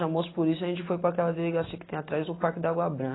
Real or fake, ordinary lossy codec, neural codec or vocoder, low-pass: real; AAC, 16 kbps; none; 7.2 kHz